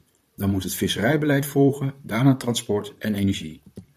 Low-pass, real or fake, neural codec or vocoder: 14.4 kHz; fake; vocoder, 44.1 kHz, 128 mel bands, Pupu-Vocoder